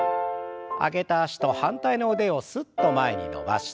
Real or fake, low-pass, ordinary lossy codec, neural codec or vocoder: real; none; none; none